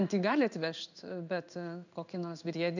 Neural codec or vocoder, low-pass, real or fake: codec, 16 kHz in and 24 kHz out, 1 kbps, XY-Tokenizer; 7.2 kHz; fake